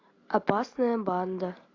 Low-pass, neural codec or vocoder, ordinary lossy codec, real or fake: 7.2 kHz; none; AAC, 32 kbps; real